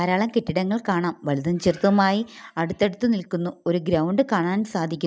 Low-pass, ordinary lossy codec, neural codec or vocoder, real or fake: none; none; none; real